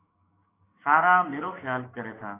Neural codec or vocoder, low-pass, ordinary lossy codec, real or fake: codec, 16 kHz, 6 kbps, DAC; 3.6 kHz; AAC, 16 kbps; fake